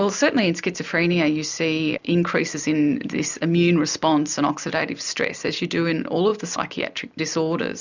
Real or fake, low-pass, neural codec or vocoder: real; 7.2 kHz; none